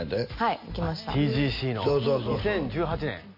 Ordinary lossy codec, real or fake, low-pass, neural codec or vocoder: MP3, 32 kbps; real; 5.4 kHz; none